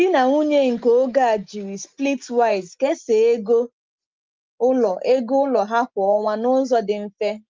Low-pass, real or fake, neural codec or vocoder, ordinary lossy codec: 7.2 kHz; real; none; Opus, 32 kbps